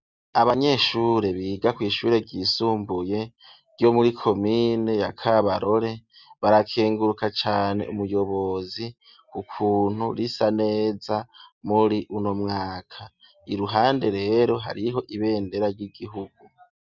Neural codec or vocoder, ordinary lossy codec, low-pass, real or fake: none; Opus, 64 kbps; 7.2 kHz; real